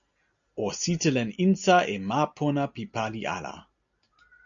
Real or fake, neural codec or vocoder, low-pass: real; none; 7.2 kHz